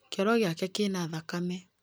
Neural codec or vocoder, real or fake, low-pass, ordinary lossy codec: none; real; none; none